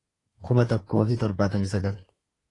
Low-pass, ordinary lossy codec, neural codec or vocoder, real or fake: 10.8 kHz; AAC, 32 kbps; codec, 24 kHz, 1 kbps, SNAC; fake